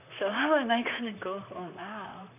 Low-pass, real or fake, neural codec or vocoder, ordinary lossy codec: 3.6 kHz; fake; vocoder, 44.1 kHz, 128 mel bands, Pupu-Vocoder; none